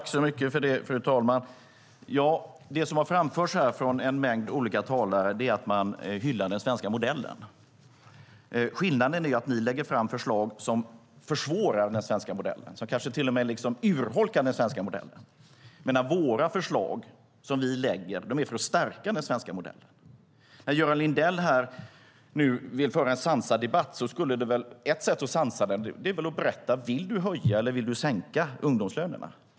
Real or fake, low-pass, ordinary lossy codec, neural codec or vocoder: real; none; none; none